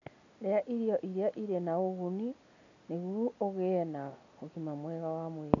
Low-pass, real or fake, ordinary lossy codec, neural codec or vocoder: 7.2 kHz; real; none; none